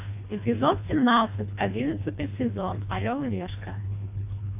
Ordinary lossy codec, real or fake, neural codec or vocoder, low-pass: AAC, 32 kbps; fake; codec, 24 kHz, 1.5 kbps, HILCodec; 3.6 kHz